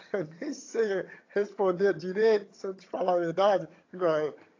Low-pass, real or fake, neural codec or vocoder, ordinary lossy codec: 7.2 kHz; fake; vocoder, 22.05 kHz, 80 mel bands, HiFi-GAN; AAC, 32 kbps